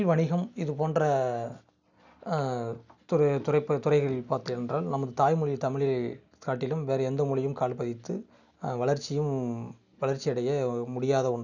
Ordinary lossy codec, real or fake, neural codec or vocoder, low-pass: none; real; none; 7.2 kHz